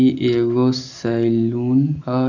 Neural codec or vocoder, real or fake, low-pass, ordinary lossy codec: none; real; 7.2 kHz; none